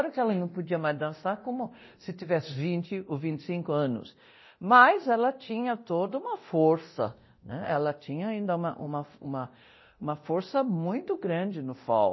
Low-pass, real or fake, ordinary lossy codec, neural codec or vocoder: 7.2 kHz; fake; MP3, 24 kbps; codec, 24 kHz, 0.9 kbps, DualCodec